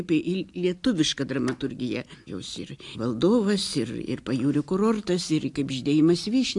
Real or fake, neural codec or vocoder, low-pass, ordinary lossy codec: real; none; 10.8 kHz; AAC, 64 kbps